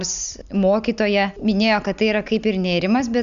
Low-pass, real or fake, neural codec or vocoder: 7.2 kHz; real; none